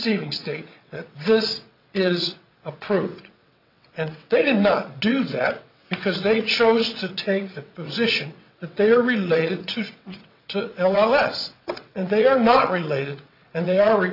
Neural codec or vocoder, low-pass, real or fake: vocoder, 22.05 kHz, 80 mel bands, WaveNeXt; 5.4 kHz; fake